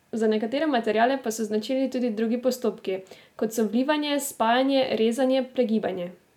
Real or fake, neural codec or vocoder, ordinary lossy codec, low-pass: real; none; none; 19.8 kHz